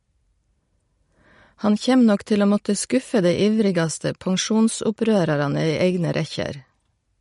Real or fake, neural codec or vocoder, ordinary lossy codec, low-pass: real; none; MP3, 48 kbps; 10.8 kHz